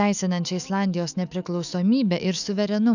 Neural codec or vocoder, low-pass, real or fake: autoencoder, 48 kHz, 128 numbers a frame, DAC-VAE, trained on Japanese speech; 7.2 kHz; fake